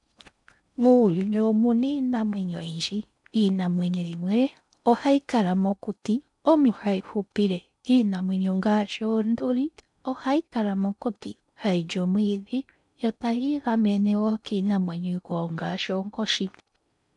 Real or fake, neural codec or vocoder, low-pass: fake; codec, 16 kHz in and 24 kHz out, 0.6 kbps, FocalCodec, streaming, 4096 codes; 10.8 kHz